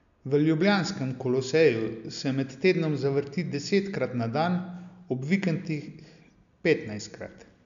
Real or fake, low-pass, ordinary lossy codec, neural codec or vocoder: real; 7.2 kHz; none; none